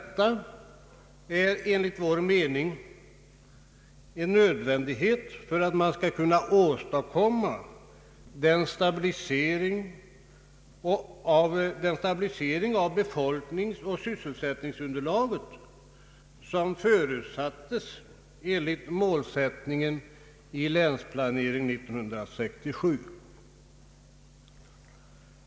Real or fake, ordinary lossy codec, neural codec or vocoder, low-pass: real; none; none; none